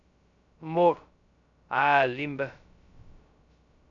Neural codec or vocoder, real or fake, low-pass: codec, 16 kHz, 0.2 kbps, FocalCodec; fake; 7.2 kHz